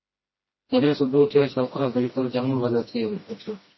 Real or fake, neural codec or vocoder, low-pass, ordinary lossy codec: fake; codec, 16 kHz, 1 kbps, FreqCodec, smaller model; 7.2 kHz; MP3, 24 kbps